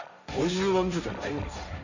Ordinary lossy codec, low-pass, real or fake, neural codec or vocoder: none; 7.2 kHz; fake; codec, 16 kHz, 1.1 kbps, Voila-Tokenizer